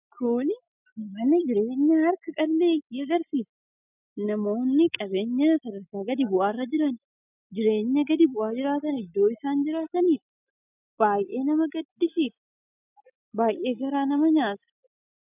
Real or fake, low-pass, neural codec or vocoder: real; 3.6 kHz; none